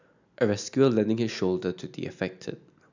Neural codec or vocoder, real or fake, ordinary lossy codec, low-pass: none; real; none; 7.2 kHz